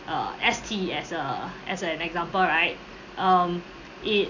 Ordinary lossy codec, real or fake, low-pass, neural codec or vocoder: none; real; 7.2 kHz; none